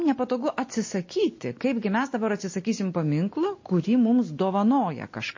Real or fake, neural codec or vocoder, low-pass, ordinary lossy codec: real; none; 7.2 kHz; MP3, 32 kbps